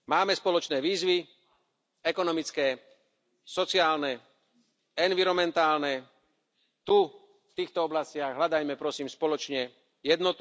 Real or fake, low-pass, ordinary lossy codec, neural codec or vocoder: real; none; none; none